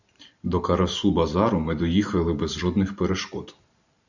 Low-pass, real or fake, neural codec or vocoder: 7.2 kHz; fake; vocoder, 44.1 kHz, 128 mel bands every 512 samples, BigVGAN v2